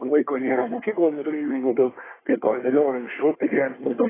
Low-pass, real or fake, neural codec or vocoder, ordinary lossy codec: 3.6 kHz; fake; codec, 24 kHz, 1 kbps, SNAC; AAC, 16 kbps